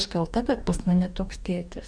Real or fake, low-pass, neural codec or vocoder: fake; 9.9 kHz; codec, 44.1 kHz, 2.6 kbps, DAC